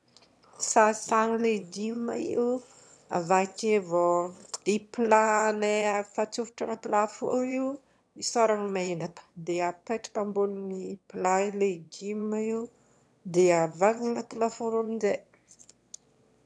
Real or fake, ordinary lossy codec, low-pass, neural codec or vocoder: fake; none; none; autoencoder, 22.05 kHz, a latent of 192 numbers a frame, VITS, trained on one speaker